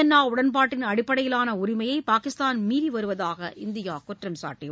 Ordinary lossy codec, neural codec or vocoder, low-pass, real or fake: none; none; none; real